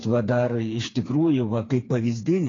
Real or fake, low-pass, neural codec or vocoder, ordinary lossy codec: fake; 7.2 kHz; codec, 16 kHz, 4 kbps, FreqCodec, smaller model; AAC, 32 kbps